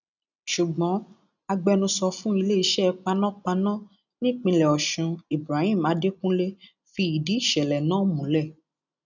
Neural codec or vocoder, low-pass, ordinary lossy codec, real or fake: none; 7.2 kHz; none; real